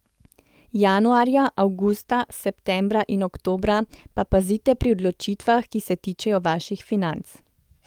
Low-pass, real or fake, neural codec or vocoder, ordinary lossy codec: 19.8 kHz; fake; codec, 44.1 kHz, 7.8 kbps, DAC; Opus, 32 kbps